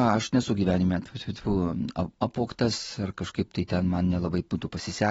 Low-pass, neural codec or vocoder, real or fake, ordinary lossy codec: 10.8 kHz; none; real; AAC, 24 kbps